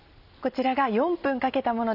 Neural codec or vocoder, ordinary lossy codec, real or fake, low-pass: none; none; real; 5.4 kHz